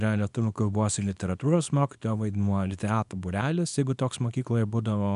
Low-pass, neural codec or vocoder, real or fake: 10.8 kHz; codec, 24 kHz, 0.9 kbps, WavTokenizer, small release; fake